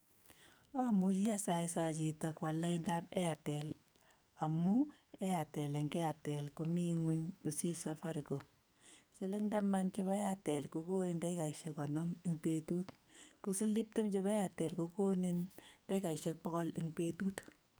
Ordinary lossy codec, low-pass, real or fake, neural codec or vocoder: none; none; fake; codec, 44.1 kHz, 2.6 kbps, SNAC